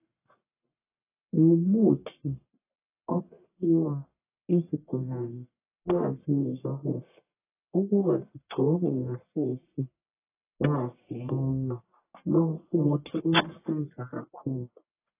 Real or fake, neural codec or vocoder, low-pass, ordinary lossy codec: fake; codec, 44.1 kHz, 1.7 kbps, Pupu-Codec; 3.6 kHz; AAC, 24 kbps